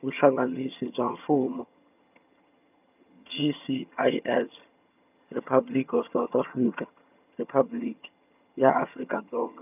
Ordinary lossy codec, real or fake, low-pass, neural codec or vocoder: none; fake; 3.6 kHz; vocoder, 22.05 kHz, 80 mel bands, HiFi-GAN